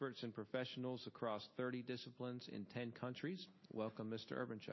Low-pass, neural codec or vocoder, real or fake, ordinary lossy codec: 7.2 kHz; none; real; MP3, 24 kbps